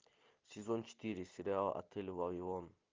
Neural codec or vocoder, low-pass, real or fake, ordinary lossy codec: none; 7.2 kHz; real; Opus, 24 kbps